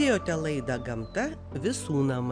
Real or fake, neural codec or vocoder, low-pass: real; none; 9.9 kHz